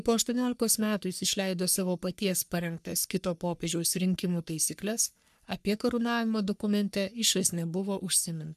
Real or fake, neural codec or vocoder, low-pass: fake; codec, 44.1 kHz, 3.4 kbps, Pupu-Codec; 14.4 kHz